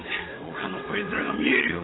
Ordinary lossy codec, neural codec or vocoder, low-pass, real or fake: AAC, 16 kbps; vocoder, 22.05 kHz, 80 mel bands, WaveNeXt; 7.2 kHz; fake